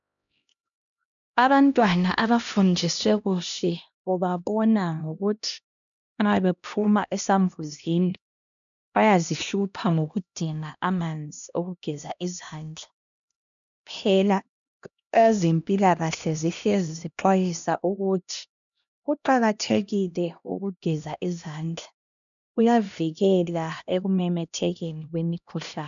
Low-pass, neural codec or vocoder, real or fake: 7.2 kHz; codec, 16 kHz, 1 kbps, X-Codec, HuBERT features, trained on LibriSpeech; fake